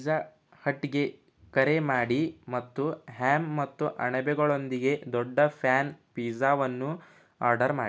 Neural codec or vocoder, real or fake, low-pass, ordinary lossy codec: none; real; none; none